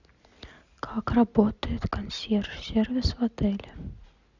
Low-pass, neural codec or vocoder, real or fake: 7.2 kHz; none; real